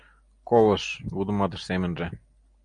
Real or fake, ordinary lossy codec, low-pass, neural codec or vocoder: real; MP3, 64 kbps; 9.9 kHz; none